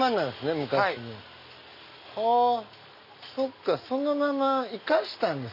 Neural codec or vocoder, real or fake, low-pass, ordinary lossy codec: none; real; 5.4 kHz; none